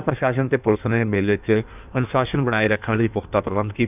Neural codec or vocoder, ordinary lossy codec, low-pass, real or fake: codec, 16 kHz in and 24 kHz out, 1.1 kbps, FireRedTTS-2 codec; none; 3.6 kHz; fake